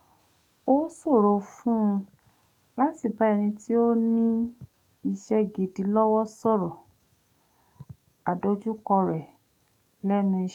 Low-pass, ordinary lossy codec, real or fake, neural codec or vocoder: 19.8 kHz; none; fake; codec, 44.1 kHz, 7.8 kbps, DAC